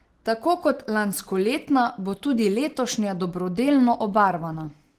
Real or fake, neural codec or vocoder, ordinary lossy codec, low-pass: real; none; Opus, 16 kbps; 14.4 kHz